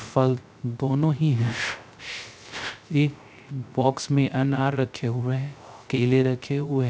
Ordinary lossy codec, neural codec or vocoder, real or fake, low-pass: none; codec, 16 kHz, 0.3 kbps, FocalCodec; fake; none